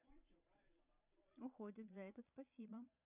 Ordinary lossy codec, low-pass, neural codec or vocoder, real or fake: none; 3.6 kHz; vocoder, 22.05 kHz, 80 mel bands, Vocos; fake